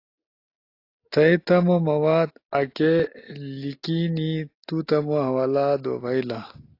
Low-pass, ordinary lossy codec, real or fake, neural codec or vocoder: 5.4 kHz; AAC, 32 kbps; real; none